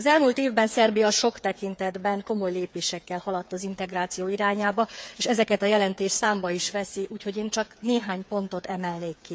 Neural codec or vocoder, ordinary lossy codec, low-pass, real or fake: codec, 16 kHz, 8 kbps, FreqCodec, smaller model; none; none; fake